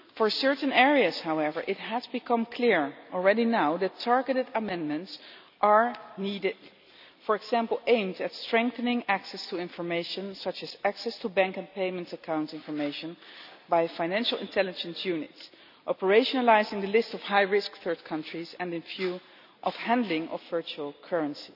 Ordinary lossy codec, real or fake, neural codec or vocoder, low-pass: none; real; none; 5.4 kHz